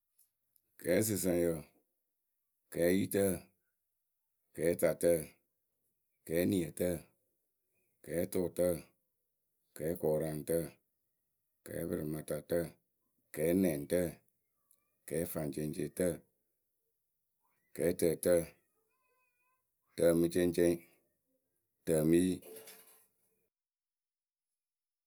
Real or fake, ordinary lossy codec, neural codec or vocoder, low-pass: real; none; none; none